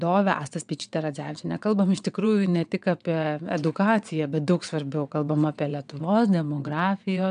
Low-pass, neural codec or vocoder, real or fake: 9.9 kHz; vocoder, 22.05 kHz, 80 mel bands, WaveNeXt; fake